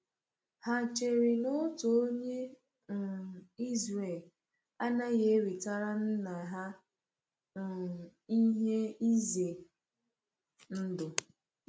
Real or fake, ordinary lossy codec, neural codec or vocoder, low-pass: real; none; none; none